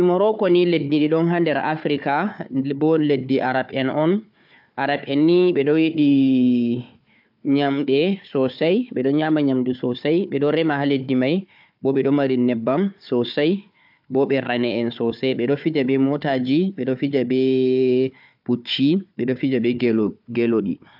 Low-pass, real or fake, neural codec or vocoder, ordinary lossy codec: 5.4 kHz; fake; codec, 16 kHz, 4 kbps, FunCodec, trained on Chinese and English, 50 frames a second; AAC, 48 kbps